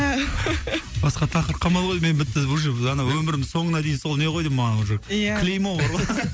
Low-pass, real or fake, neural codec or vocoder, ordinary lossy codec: none; real; none; none